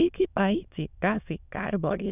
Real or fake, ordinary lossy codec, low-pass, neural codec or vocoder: fake; none; 3.6 kHz; autoencoder, 22.05 kHz, a latent of 192 numbers a frame, VITS, trained on many speakers